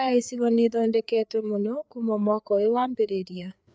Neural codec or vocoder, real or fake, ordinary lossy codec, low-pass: codec, 16 kHz, 4 kbps, FreqCodec, larger model; fake; none; none